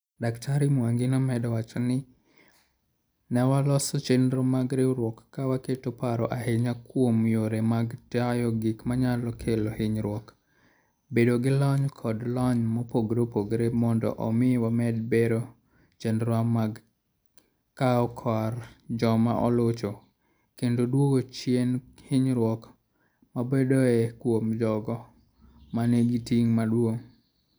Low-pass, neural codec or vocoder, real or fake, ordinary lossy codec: none; none; real; none